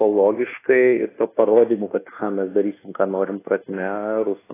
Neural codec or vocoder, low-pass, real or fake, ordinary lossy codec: codec, 24 kHz, 1.2 kbps, DualCodec; 3.6 kHz; fake; AAC, 16 kbps